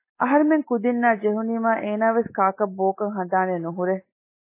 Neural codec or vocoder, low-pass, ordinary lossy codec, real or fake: none; 3.6 kHz; MP3, 16 kbps; real